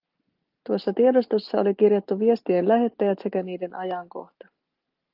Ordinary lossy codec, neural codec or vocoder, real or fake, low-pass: Opus, 32 kbps; none; real; 5.4 kHz